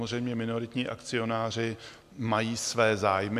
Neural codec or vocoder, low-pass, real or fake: none; 14.4 kHz; real